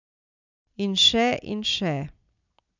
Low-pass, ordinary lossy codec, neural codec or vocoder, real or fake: 7.2 kHz; none; none; real